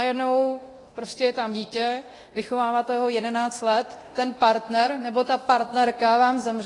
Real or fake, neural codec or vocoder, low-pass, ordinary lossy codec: fake; codec, 24 kHz, 0.9 kbps, DualCodec; 10.8 kHz; AAC, 32 kbps